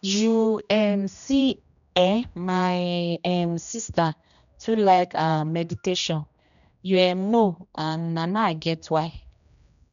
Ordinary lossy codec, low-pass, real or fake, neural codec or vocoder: none; 7.2 kHz; fake; codec, 16 kHz, 1 kbps, X-Codec, HuBERT features, trained on general audio